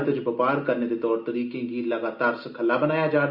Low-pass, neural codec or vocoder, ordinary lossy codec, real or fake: 5.4 kHz; none; Opus, 64 kbps; real